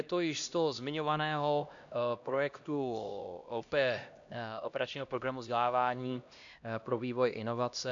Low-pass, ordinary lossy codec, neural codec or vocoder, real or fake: 7.2 kHz; AAC, 64 kbps; codec, 16 kHz, 1 kbps, X-Codec, HuBERT features, trained on LibriSpeech; fake